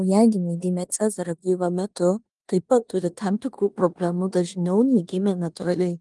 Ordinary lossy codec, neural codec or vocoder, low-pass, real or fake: Opus, 24 kbps; codec, 16 kHz in and 24 kHz out, 0.9 kbps, LongCat-Audio-Codec, four codebook decoder; 10.8 kHz; fake